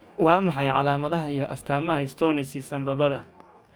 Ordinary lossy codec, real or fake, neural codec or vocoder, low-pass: none; fake; codec, 44.1 kHz, 2.6 kbps, DAC; none